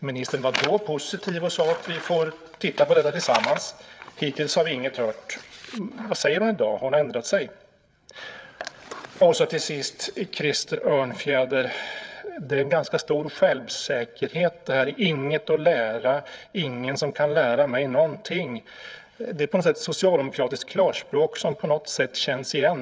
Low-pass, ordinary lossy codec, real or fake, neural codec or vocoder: none; none; fake; codec, 16 kHz, 8 kbps, FreqCodec, larger model